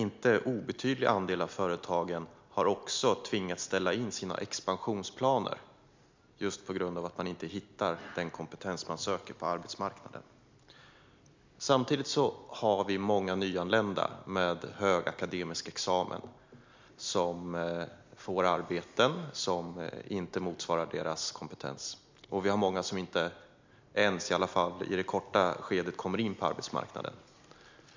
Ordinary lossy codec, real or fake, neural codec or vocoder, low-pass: MP3, 64 kbps; real; none; 7.2 kHz